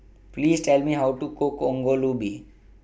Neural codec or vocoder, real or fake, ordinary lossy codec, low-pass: none; real; none; none